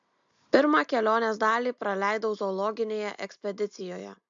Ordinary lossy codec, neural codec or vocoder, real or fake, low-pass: AAC, 64 kbps; none; real; 7.2 kHz